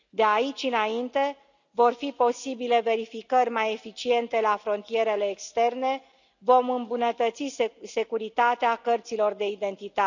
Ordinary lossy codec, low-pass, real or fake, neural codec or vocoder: none; 7.2 kHz; real; none